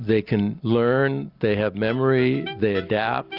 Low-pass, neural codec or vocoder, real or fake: 5.4 kHz; none; real